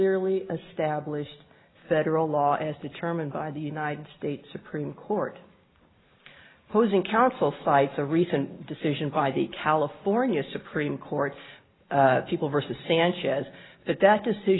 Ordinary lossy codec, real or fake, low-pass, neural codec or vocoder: AAC, 16 kbps; real; 7.2 kHz; none